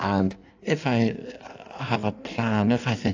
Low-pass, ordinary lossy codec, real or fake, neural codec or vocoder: 7.2 kHz; AAC, 48 kbps; fake; codec, 16 kHz in and 24 kHz out, 1.1 kbps, FireRedTTS-2 codec